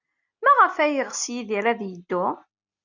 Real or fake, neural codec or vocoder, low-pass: real; none; 7.2 kHz